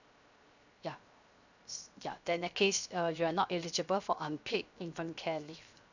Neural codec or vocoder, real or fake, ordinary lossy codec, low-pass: codec, 16 kHz, 0.7 kbps, FocalCodec; fake; none; 7.2 kHz